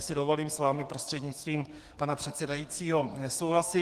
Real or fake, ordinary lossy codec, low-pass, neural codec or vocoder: fake; Opus, 24 kbps; 14.4 kHz; codec, 32 kHz, 1.9 kbps, SNAC